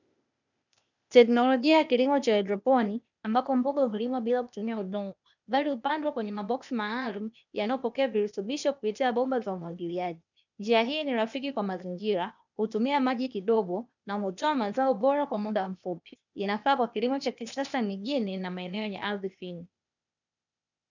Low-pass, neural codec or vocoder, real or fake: 7.2 kHz; codec, 16 kHz, 0.8 kbps, ZipCodec; fake